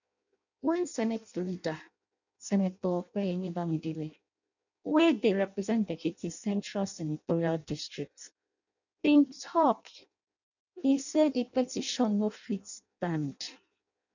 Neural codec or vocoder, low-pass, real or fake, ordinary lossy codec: codec, 16 kHz in and 24 kHz out, 0.6 kbps, FireRedTTS-2 codec; 7.2 kHz; fake; none